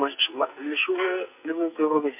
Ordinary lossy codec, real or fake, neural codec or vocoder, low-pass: MP3, 32 kbps; fake; codec, 44.1 kHz, 2.6 kbps, SNAC; 3.6 kHz